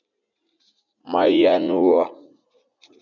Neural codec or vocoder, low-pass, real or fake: vocoder, 44.1 kHz, 80 mel bands, Vocos; 7.2 kHz; fake